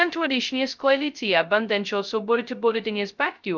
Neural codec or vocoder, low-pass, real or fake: codec, 16 kHz, 0.2 kbps, FocalCodec; 7.2 kHz; fake